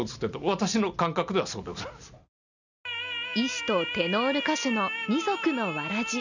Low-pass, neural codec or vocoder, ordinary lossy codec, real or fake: 7.2 kHz; none; none; real